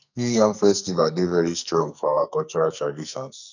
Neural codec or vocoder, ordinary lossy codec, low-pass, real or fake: codec, 44.1 kHz, 2.6 kbps, SNAC; none; 7.2 kHz; fake